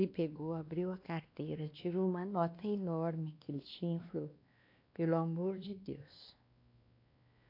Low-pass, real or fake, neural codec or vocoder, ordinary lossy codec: 5.4 kHz; fake; codec, 16 kHz, 2 kbps, X-Codec, WavLM features, trained on Multilingual LibriSpeech; AAC, 32 kbps